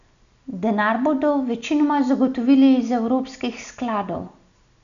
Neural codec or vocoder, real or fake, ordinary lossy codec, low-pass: none; real; none; 7.2 kHz